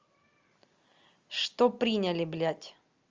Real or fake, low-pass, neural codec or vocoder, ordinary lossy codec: real; 7.2 kHz; none; Opus, 32 kbps